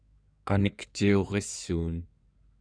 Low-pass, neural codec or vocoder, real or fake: 9.9 kHz; codec, 16 kHz in and 24 kHz out, 2.2 kbps, FireRedTTS-2 codec; fake